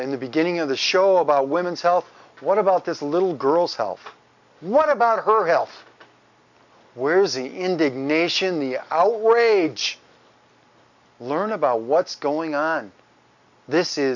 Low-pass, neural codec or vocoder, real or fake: 7.2 kHz; none; real